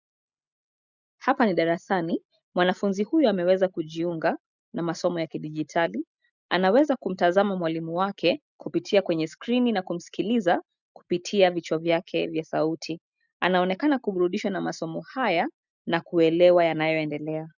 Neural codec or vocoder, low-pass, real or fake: none; 7.2 kHz; real